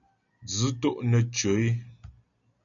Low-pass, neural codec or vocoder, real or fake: 7.2 kHz; none; real